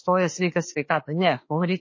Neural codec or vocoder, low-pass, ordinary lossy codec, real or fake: autoencoder, 48 kHz, 32 numbers a frame, DAC-VAE, trained on Japanese speech; 7.2 kHz; MP3, 32 kbps; fake